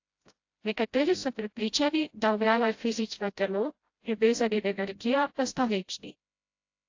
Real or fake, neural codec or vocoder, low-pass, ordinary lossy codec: fake; codec, 16 kHz, 0.5 kbps, FreqCodec, smaller model; 7.2 kHz; AAC, 48 kbps